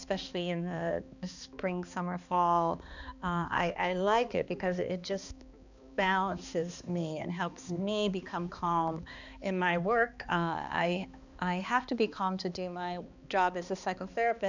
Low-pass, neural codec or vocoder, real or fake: 7.2 kHz; codec, 16 kHz, 2 kbps, X-Codec, HuBERT features, trained on balanced general audio; fake